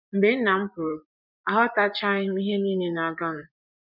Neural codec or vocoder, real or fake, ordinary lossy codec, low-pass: vocoder, 24 kHz, 100 mel bands, Vocos; fake; none; 5.4 kHz